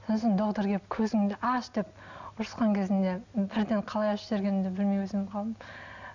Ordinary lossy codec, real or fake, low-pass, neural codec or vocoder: none; real; 7.2 kHz; none